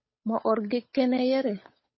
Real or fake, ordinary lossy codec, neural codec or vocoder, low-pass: fake; MP3, 24 kbps; codec, 16 kHz, 8 kbps, FunCodec, trained on Chinese and English, 25 frames a second; 7.2 kHz